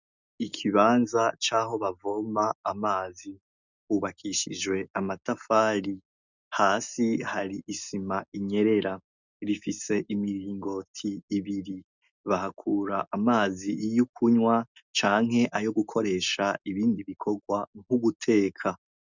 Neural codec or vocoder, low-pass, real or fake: none; 7.2 kHz; real